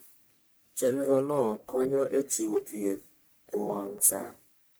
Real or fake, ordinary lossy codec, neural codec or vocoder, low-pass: fake; none; codec, 44.1 kHz, 1.7 kbps, Pupu-Codec; none